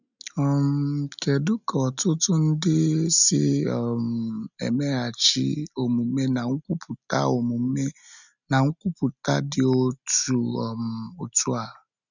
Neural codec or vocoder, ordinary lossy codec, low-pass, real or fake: none; none; 7.2 kHz; real